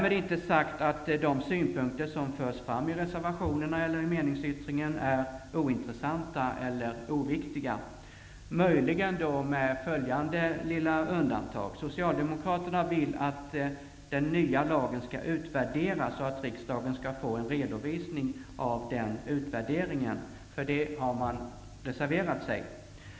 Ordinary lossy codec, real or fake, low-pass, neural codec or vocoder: none; real; none; none